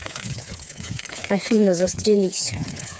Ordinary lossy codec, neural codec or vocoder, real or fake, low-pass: none; codec, 16 kHz, 4 kbps, FreqCodec, smaller model; fake; none